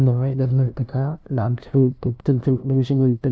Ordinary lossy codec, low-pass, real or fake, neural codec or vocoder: none; none; fake; codec, 16 kHz, 0.5 kbps, FunCodec, trained on LibriTTS, 25 frames a second